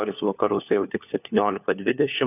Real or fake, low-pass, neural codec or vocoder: fake; 3.6 kHz; codec, 16 kHz, 4 kbps, FunCodec, trained on LibriTTS, 50 frames a second